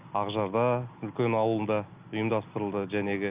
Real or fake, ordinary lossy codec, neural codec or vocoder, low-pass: real; Opus, 24 kbps; none; 3.6 kHz